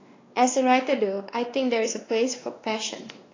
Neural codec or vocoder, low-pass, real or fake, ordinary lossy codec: codec, 16 kHz, 2 kbps, X-Codec, WavLM features, trained on Multilingual LibriSpeech; 7.2 kHz; fake; AAC, 32 kbps